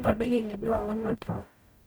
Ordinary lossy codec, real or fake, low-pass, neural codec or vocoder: none; fake; none; codec, 44.1 kHz, 0.9 kbps, DAC